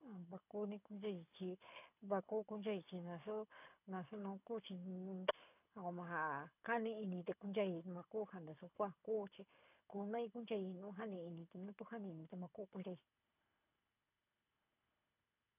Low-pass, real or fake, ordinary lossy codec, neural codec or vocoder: 3.6 kHz; fake; none; codec, 24 kHz, 6 kbps, HILCodec